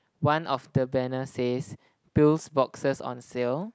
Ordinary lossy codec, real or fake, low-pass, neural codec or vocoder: none; real; none; none